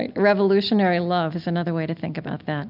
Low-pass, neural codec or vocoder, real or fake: 5.4 kHz; none; real